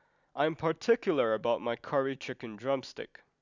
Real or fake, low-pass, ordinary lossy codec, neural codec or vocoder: real; 7.2 kHz; none; none